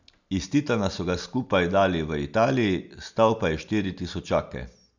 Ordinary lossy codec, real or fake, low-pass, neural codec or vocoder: none; real; 7.2 kHz; none